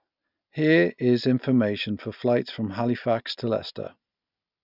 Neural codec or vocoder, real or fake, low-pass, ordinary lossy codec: none; real; 5.4 kHz; none